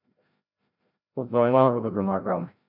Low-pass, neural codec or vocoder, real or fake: 5.4 kHz; codec, 16 kHz, 0.5 kbps, FreqCodec, larger model; fake